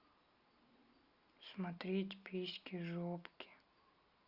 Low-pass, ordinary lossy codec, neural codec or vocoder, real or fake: 5.4 kHz; Opus, 64 kbps; none; real